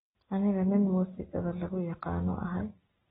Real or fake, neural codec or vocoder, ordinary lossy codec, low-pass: real; none; AAC, 16 kbps; 7.2 kHz